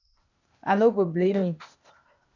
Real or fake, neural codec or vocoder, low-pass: fake; codec, 16 kHz, 0.8 kbps, ZipCodec; 7.2 kHz